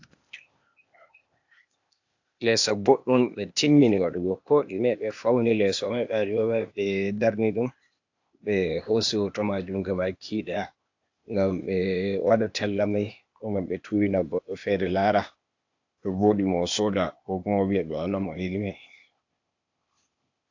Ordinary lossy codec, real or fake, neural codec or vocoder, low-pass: AAC, 48 kbps; fake; codec, 16 kHz, 0.8 kbps, ZipCodec; 7.2 kHz